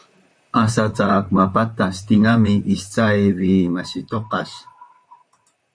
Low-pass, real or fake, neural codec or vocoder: 9.9 kHz; fake; vocoder, 44.1 kHz, 128 mel bands, Pupu-Vocoder